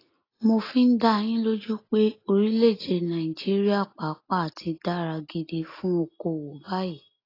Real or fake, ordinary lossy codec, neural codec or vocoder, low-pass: real; AAC, 24 kbps; none; 5.4 kHz